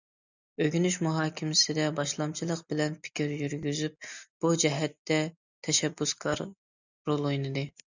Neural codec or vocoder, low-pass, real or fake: none; 7.2 kHz; real